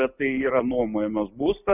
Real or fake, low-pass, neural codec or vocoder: real; 3.6 kHz; none